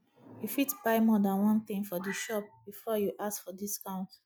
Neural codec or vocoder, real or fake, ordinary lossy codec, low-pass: none; real; none; none